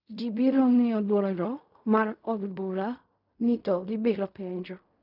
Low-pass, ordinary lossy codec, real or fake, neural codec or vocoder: 5.4 kHz; none; fake; codec, 16 kHz in and 24 kHz out, 0.4 kbps, LongCat-Audio-Codec, fine tuned four codebook decoder